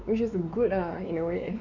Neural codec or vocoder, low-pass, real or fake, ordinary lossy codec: codec, 16 kHz, 4 kbps, X-Codec, WavLM features, trained on Multilingual LibriSpeech; 7.2 kHz; fake; none